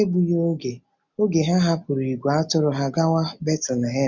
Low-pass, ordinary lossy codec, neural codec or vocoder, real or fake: 7.2 kHz; none; none; real